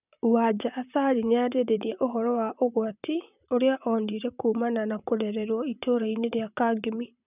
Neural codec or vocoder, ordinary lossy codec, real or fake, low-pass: vocoder, 44.1 kHz, 128 mel bands, Pupu-Vocoder; none; fake; 3.6 kHz